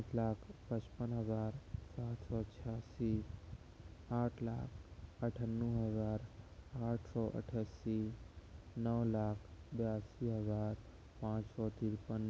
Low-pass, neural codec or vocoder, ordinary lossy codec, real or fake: none; none; none; real